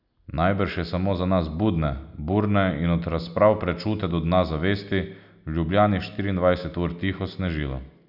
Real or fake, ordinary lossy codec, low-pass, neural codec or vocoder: real; none; 5.4 kHz; none